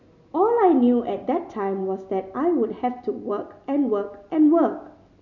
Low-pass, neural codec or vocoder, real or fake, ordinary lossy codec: 7.2 kHz; none; real; none